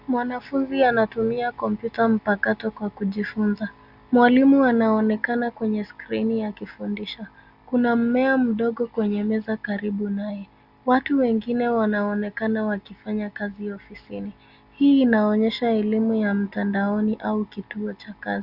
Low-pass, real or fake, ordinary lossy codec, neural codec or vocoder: 5.4 kHz; real; Opus, 64 kbps; none